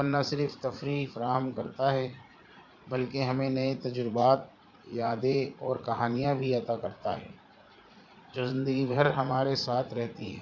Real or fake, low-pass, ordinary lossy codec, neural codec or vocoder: fake; 7.2 kHz; none; vocoder, 44.1 kHz, 80 mel bands, Vocos